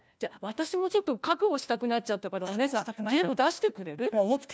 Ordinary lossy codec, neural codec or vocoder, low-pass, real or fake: none; codec, 16 kHz, 1 kbps, FunCodec, trained on LibriTTS, 50 frames a second; none; fake